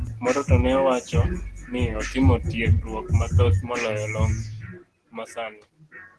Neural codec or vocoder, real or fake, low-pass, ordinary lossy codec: none; real; 10.8 kHz; Opus, 16 kbps